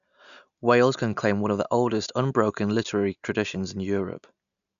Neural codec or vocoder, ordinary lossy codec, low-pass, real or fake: none; none; 7.2 kHz; real